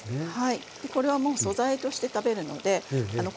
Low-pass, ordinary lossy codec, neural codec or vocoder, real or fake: none; none; none; real